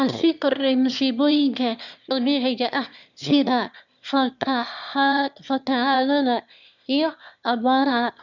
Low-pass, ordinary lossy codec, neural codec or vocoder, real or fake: 7.2 kHz; none; autoencoder, 22.05 kHz, a latent of 192 numbers a frame, VITS, trained on one speaker; fake